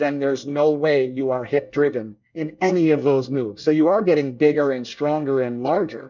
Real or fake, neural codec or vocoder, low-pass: fake; codec, 24 kHz, 1 kbps, SNAC; 7.2 kHz